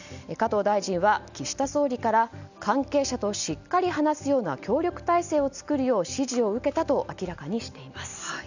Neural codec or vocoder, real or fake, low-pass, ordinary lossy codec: none; real; 7.2 kHz; none